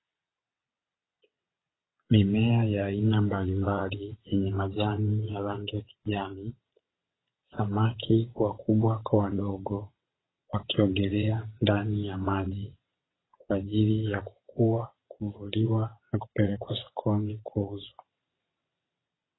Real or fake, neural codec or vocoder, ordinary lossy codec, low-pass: fake; vocoder, 22.05 kHz, 80 mel bands, WaveNeXt; AAC, 16 kbps; 7.2 kHz